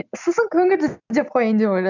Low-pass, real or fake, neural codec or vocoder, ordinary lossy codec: 7.2 kHz; real; none; none